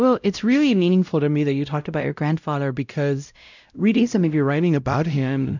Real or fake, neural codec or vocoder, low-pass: fake; codec, 16 kHz, 0.5 kbps, X-Codec, WavLM features, trained on Multilingual LibriSpeech; 7.2 kHz